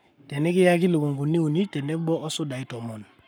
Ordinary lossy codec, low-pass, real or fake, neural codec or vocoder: none; none; fake; codec, 44.1 kHz, 7.8 kbps, Pupu-Codec